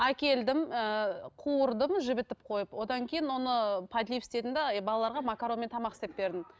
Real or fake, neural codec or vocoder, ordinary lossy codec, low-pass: real; none; none; none